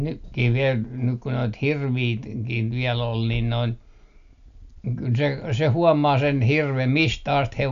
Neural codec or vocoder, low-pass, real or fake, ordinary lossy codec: none; 7.2 kHz; real; none